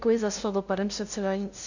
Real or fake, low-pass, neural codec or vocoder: fake; 7.2 kHz; codec, 16 kHz, 0.5 kbps, FunCodec, trained on LibriTTS, 25 frames a second